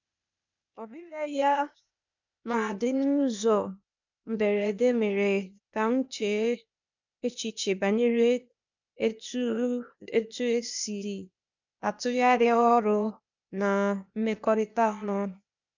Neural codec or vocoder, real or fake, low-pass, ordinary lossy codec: codec, 16 kHz, 0.8 kbps, ZipCodec; fake; 7.2 kHz; none